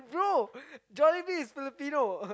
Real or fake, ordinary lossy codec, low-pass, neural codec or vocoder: real; none; none; none